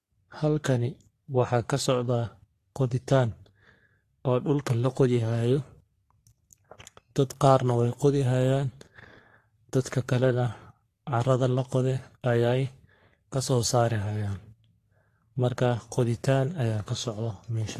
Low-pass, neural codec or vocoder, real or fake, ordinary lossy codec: 14.4 kHz; codec, 44.1 kHz, 3.4 kbps, Pupu-Codec; fake; AAC, 48 kbps